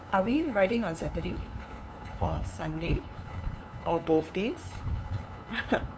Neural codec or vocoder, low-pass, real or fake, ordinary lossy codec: codec, 16 kHz, 2 kbps, FunCodec, trained on LibriTTS, 25 frames a second; none; fake; none